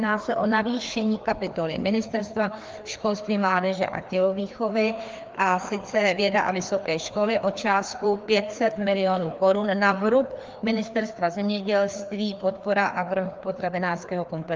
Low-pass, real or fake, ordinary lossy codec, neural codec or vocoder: 7.2 kHz; fake; Opus, 24 kbps; codec, 16 kHz, 2 kbps, FreqCodec, larger model